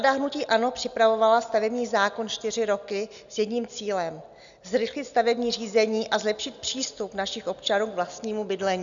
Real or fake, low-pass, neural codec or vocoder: real; 7.2 kHz; none